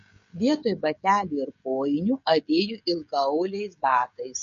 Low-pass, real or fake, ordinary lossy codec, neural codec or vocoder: 7.2 kHz; fake; MP3, 48 kbps; codec, 16 kHz, 16 kbps, FreqCodec, smaller model